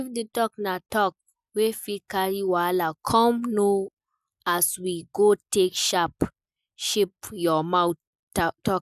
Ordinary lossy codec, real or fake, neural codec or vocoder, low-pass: none; real; none; 14.4 kHz